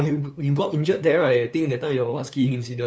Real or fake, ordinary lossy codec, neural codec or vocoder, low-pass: fake; none; codec, 16 kHz, 2 kbps, FunCodec, trained on LibriTTS, 25 frames a second; none